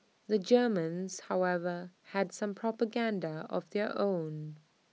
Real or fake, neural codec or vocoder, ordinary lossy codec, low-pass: real; none; none; none